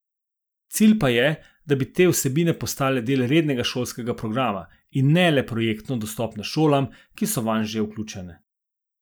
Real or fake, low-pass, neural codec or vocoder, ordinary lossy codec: real; none; none; none